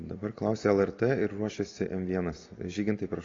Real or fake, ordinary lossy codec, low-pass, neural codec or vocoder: real; AAC, 32 kbps; 7.2 kHz; none